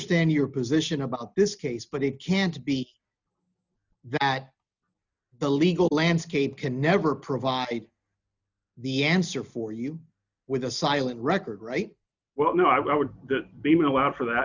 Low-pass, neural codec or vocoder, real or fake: 7.2 kHz; none; real